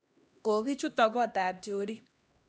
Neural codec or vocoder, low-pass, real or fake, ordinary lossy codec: codec, 16 kHz, 1 kbps, X-Codec, HuBERT features, trained on LibriSpeech; none; fake; none